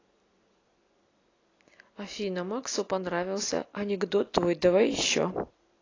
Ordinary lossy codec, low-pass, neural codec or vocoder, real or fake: AAC, 32 kbps; 7.2 kHz; none; real